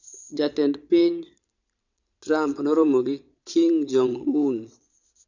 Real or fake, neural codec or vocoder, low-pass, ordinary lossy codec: fake; vocoder, 22.05 kHz, 80 mel bands, Vocos; 7.2 kHz; none